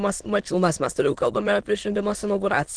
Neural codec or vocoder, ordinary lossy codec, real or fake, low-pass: autoencoder, 22.05 kHz, a latent of 192 numbers a frame, VITS, trained on many speakers; Opus, 16 kbps; fake; 9.9 kHz